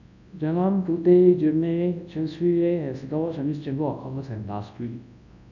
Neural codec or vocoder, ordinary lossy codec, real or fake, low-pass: codec, 24 kHz, 0.9 kbps, WavTokenizer, large speech release; none; fake; 7.2 kHz